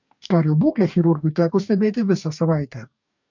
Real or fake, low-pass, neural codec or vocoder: fake; 7.2 kHz; codec, 44.1 kHz, 2.6 kbps, DAC